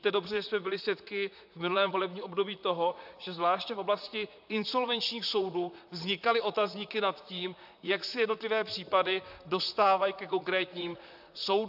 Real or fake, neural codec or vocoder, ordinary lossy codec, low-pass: fake; vocoder, 44.1 kHz, 128 mel bands, Pupu-Vocoder; AAC, 48 kbps; 5.4 kHz